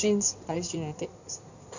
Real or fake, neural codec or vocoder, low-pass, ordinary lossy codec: fake; codec, 16 kHz in and 24 kHz out, 2.2 kbps, FireRedTTS-2 codec; 7.2 kHz; none